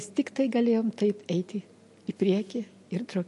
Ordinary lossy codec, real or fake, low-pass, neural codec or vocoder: MP3, 48 kbps; real; 14.4 kHz; none